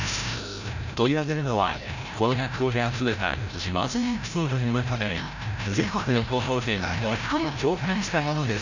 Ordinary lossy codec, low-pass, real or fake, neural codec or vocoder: none; 7.2 kHz; fake; codec, 16 kHz, 0.5 kbps, FreqCodec, larger model